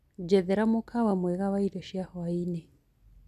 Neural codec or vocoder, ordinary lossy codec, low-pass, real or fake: none; none; 14.4 kHz; real